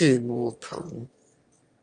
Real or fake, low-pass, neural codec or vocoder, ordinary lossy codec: fake; 9.9 kHz; autoencoder, 22.05 kHz, a latent of 192 numbers a frame, VITS, trained on one speaker; Opus, 32 kbps